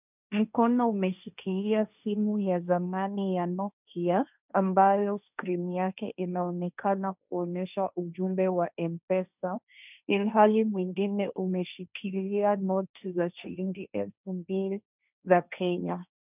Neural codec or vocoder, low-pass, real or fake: codec, 16 kHz, 1.1 kbps, Voila-Tokenizer; 3.6 kHz; fake